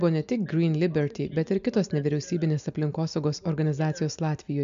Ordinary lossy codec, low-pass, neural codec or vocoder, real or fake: MP3, 64 kbps; 7.2 kHz; none; real